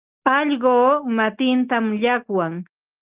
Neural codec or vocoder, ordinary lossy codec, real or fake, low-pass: none; Opus, 32 kbps; real; 3.6 kHz